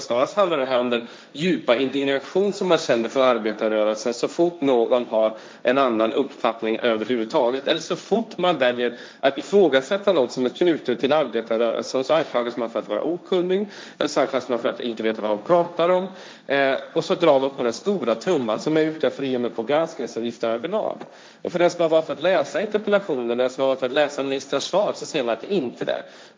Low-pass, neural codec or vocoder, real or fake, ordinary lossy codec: none; codec, 16 kHz, 1.1 kbps, Voila-Tokenizer; fake; none